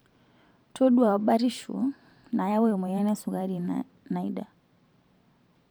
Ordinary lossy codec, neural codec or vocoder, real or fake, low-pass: none; vocoder, 44.1 kHz, 128 mel bands every 512 samples, BigVGAN v2; fake; none